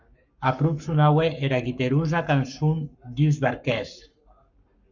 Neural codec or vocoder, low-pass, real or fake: codec, 44.1 kHz, 7.8 kbps, Pupu-Codec; 7.2 kHz; fake